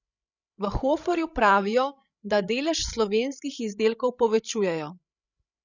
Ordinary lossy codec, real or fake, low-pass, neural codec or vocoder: none; fake; 7.2 kHz; codec, 16 kHz, 16 kbps, FreqCodec, larger model